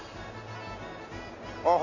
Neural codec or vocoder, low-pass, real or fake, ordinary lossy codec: none; 7.2 kHz; real; none